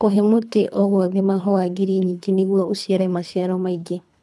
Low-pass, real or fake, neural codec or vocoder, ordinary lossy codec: none; fake; codec, 24 kHz, 3 kbps, HILCodec; none